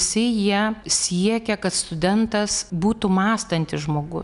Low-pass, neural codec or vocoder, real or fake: 10.8 kHz; none; real